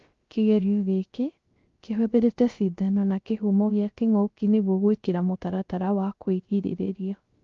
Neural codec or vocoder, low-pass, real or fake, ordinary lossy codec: codec, 16 kHz, 0.3 kbps, FocalCodec; 7.2 kHz; fake; Opus, 32 kbps